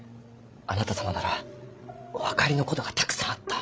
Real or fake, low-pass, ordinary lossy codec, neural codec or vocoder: fake; none; none; codec, 16 kHz, 16 kbps, FreqCodec, larger model